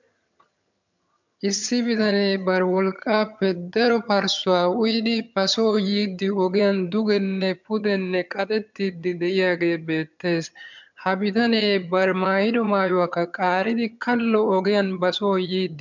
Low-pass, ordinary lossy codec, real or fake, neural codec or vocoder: 7.2 kHz; MP3, 64 kbps; fake; vocoder, 22.05 kHz, 80 mel bands, HiFi-GAN